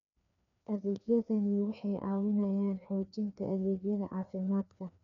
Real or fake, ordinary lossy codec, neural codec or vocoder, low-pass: fake; none; codec, 16 kHz, 2 kbps, FreqCodec, larger model; 7.2 kHz